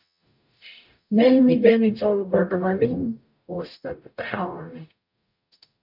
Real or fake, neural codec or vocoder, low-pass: fake; codec, 44.1 kHz, 0.9 kbps, DAC; 5.4 kHz